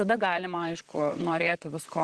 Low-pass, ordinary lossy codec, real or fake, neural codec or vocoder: 10.8 kHz; Opus, 16 kbps; fake; vocoder, 44.1 kHz, 128 mel bands, Pupu-Vocoder